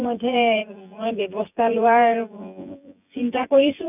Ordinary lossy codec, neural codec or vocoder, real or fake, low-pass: none; vocoder, 24 kHz, 100 mel bands, Vocos; fake; 3.6 kHz